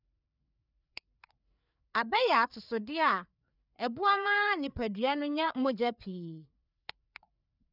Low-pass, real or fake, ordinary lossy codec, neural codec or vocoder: 5.4 kHz; fake; none; codec, 16 kHz, 4 kbps, FreqCodec, larger model